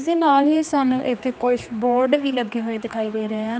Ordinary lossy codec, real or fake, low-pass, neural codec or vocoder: none; fake; none; codec, 16 kHz, 2 kbps, X-Codec, HuBERT features, trained on general audio